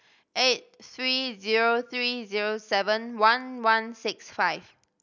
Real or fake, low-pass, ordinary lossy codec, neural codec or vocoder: real; 7.2 kHz; none; none